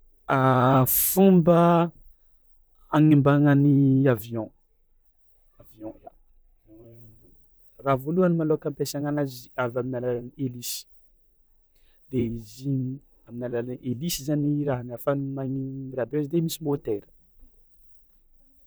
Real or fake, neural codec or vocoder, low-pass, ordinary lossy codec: fake; vocoder, 44.1 kHz, 128 mel bands, Pupu-Vocoder; none; none